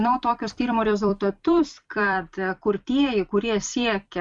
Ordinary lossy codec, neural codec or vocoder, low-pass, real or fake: MP3, 96 kbps; vocoder, 44.1 kHz, 128 mel bands every 256 samples, BigVGAN v2; 10.8 kHz; fake